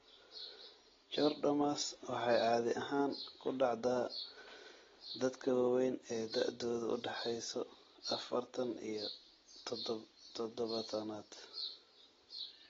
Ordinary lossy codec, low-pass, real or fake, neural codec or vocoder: AAC, 24 kbps; 7.2 kHz; real; none